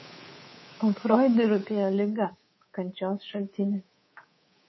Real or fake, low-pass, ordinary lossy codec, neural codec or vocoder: fake; 7.2 kHz; MP3, 24 kbps; codec, 24 kHz, 3.1 kbps, DualCodec